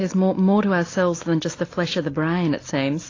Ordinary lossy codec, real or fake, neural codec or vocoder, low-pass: AAC, 32 kbps; real; none; 7.2 kHz